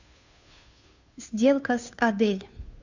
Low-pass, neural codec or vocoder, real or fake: 7.2 kHz; codec, 16 kHz, 2 kbps, FunCodec, trained on Chinese and English, 25 frames a second; fake